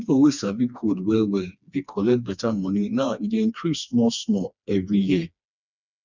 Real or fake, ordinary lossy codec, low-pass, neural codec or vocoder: fake; none; 7.2 kHz; codec, 16 kHz, 2 kbps, FreqCodec, smaller model